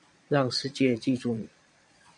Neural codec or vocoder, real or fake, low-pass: vocoder, 22.05 kHz, 80 mel bands, Vocos; fake; 9.9 kHz